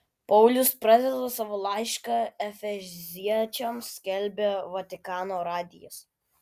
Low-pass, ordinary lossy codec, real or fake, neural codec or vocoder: 14.4 kHz; Opus, 64 kbps; real; none